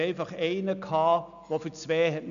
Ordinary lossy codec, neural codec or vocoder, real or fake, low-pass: none; none; real; 7.2 kHz